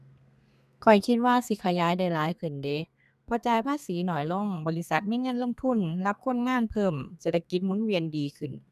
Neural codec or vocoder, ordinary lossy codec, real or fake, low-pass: codec, 32 kHz, 1.9 kbps, SNAC; none; fake; 14.4 kHz